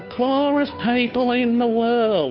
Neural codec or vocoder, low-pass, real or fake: codec, 16 kHz, 2 kbps, FunCodec, trained on Chinese and English, 25 frames a second; 7.2 kHz; fake